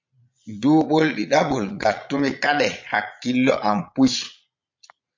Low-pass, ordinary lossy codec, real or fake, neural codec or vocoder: 7.2 kHz; MP3, 48 kbps; fake; vocoder, 22.05 kHz, 80 mel bands, Vocos